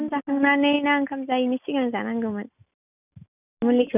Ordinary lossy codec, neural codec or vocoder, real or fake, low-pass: none; none; real; 3.6 kHz